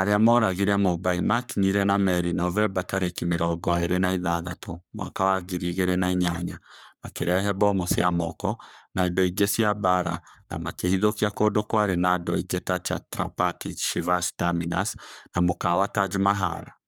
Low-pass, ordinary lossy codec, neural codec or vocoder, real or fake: none; none; codec, 44.1 kHz, 3.4 kbps, Pupu-Codec; fake